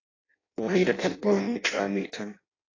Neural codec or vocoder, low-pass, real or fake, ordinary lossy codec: codec, 16 kHz in and 24 kHz out, 0.6 kbps, FireRedTTS-2 codec; 7.2 kHz; fake; AAC, 32 kbps